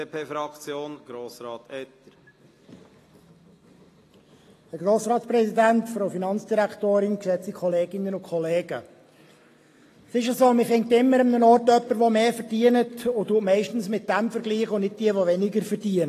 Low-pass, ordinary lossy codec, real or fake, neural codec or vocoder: 14.4 kHz; AAC, 48 kbps; real; none